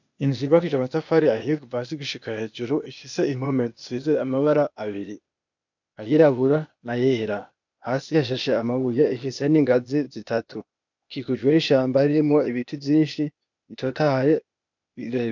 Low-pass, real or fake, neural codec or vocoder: 7.2 kHz; fake; codec, 16 kHz, 0.8 kbps, ZipCodec